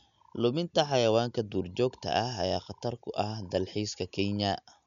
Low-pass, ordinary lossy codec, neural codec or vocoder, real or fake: 7.2 kHz; none; none; real